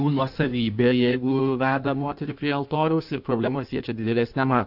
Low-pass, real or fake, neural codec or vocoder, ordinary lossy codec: 5.4 kHz; fake; codec, 16 kHz in and 24 kHz out, 1.1 kbps, FireRedTTS-2 codec; AAC, 48 kbps